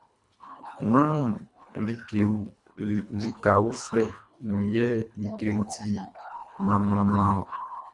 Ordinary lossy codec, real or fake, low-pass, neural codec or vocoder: MP3, 96 kbps; fake; 10.8 kHz; codec, 24 kHz, 1.5 kbps, HILCodec